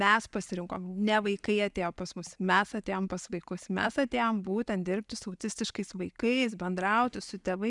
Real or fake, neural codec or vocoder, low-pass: real; none; 10.8 kHz